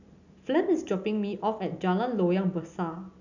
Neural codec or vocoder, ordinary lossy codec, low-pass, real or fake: none; none; 7.2 kHz; real